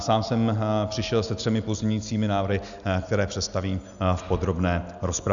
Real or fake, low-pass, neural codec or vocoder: real; 7.2 kHz; none